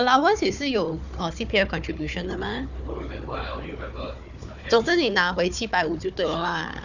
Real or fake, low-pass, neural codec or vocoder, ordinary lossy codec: fake; 7.2 kHz; codec, 16 kHz, 4 kbps, FunCodec, trained on Chinese and English, 50 frames a second; none